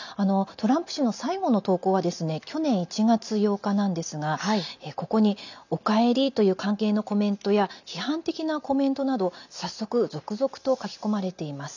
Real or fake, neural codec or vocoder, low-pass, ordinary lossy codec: real; none; 7.2 kHz; none